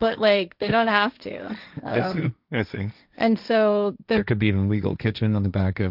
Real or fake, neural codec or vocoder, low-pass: fake; codec, 16 kHz, 1.1 kbps, Voila-Tokenizer; 5.4 kHz